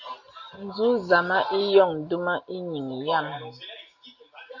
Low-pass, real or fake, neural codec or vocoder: 7.2 kHz; real; none